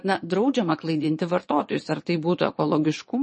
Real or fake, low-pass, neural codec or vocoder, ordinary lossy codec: fake; 9.9 kHz; vocoder, 22.05 kHz, 80 mel bands, Vocos; MP3, 32 kbps